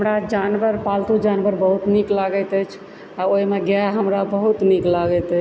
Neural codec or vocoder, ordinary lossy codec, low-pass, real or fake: none; none; none; real